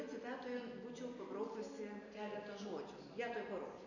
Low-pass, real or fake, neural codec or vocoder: 7.2 kHz; real; none